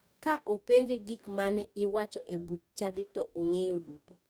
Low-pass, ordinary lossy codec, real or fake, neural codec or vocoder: none; none; fake; codec, 44.1 kHz, 2.6 kbps, DAC